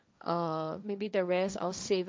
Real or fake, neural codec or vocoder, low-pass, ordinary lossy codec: fake; codec, 16 kHz, 1.1 kbps, Voila-Tokenizer; none; none